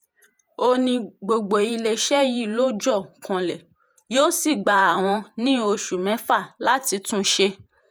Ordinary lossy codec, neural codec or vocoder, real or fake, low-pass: none; vocoder, 48 kHz, 128 mel bands, Vocos; fake; none